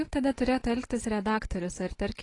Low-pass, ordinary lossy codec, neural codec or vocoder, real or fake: 10.8 kHz; AAC, 32 kbps; none; real